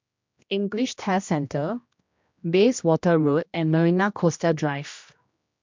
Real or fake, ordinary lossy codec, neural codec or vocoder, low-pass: fake; MP3, 64 kbps; codec, 16 kHz, 1 kbps, X-Codec, HuBERT features, trained on general audio; 7.2 kHz